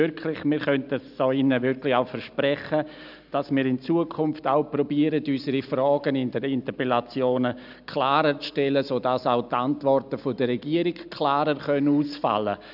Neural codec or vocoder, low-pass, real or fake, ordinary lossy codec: none; 5.4 kHz; real; none